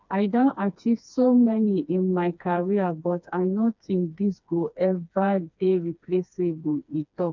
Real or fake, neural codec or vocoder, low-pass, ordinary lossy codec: fake; codec, 16 kHz, 2 kbps, FreqCodec, smaller model; 7.2 kHz; none